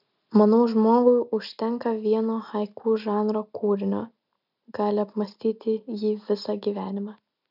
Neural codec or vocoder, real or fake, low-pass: none; real; 5.4 kHz